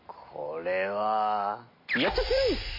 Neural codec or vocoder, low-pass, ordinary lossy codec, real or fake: none; 5.4 kHz; MP3, 24 kbps; real